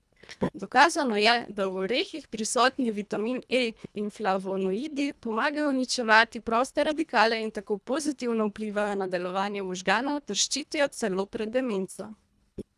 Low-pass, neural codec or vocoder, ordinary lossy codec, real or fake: none; codec, 24 kHz, 1.5 kbps, HILCodec; none; fake